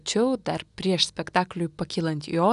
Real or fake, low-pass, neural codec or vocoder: real; 10.8 kHz; none